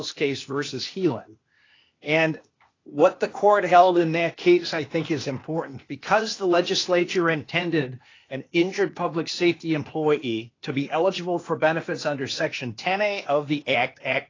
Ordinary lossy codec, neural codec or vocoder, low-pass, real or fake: AAC, 32 kbps; codec, 16 kHz, 0.8 kbps, ZipCodec; 7.2 kHz; fake